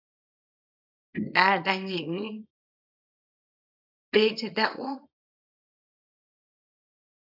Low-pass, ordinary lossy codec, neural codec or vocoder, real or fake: 5.4 kHz; AAC, 48 kbps; codec, 24 kHz, 0.9 kbps, WavTokenizer, small release; fake